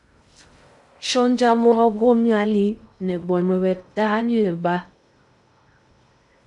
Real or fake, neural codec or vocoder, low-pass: fake; codec, 16 kHz in and 24 kHz out, 0.6 kbps, FocalCodec, streaming, 2048 codes; 10.8 kHz